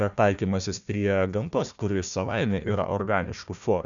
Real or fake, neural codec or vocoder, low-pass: fake; codec, 16 kHz, 1 kbps, FunCodec, trained on Chinese and English, 50 frames a second; 7.2 kHz